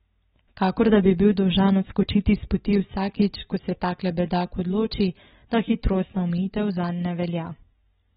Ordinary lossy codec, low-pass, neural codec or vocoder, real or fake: AAC, 16 kbps; 10.8 kHz; none; real